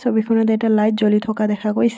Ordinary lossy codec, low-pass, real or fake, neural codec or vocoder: none; none; real; none